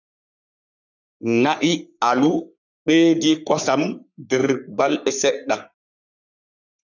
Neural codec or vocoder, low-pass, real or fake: codec, 44.1 kHz, 3.4 kbps, Pupu-Codec; 7.2 kHz; fake